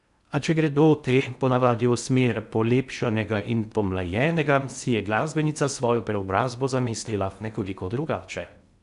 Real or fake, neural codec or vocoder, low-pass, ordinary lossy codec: fake; codec, 16 kHz in and 24 kHz out, 0.6 kbps, FocalCodec, streaming, 4096 codes; 10.8 kHz; none